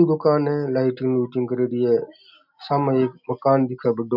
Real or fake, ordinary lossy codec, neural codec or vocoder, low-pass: real; none; none; 5.4 kHz